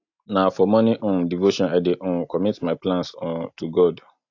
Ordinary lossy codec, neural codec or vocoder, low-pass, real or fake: AAC, 48 kbps; none; 7.2 kHz; real